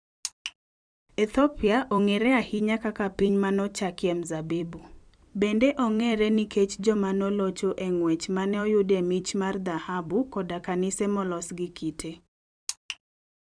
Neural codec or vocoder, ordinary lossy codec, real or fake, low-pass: vocoder, 44.1 kHz, 128 mel bands every 256 samples, BigVGAN v2; none; fake; 9.9 kHz